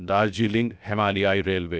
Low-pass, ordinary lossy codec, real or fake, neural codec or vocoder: none; none; fake; codec, 16 kHz, 0.7 kbps, FocalCodec